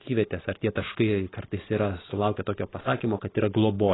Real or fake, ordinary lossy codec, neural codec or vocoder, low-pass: real; AAC, 16 kbps; none; 7.2 kHz